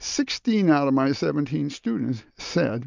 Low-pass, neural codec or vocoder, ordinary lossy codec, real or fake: 7.2 kHz; none; MP3, 64 kbps; real